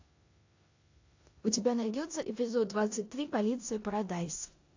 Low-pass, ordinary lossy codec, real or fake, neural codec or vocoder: 7.2 kHz; AAC, 48 kbps; fake; codec, 16 kHz in and 24 kHz out, 0.9 kbps, LongCat-Audio-Codec, four codebook decoder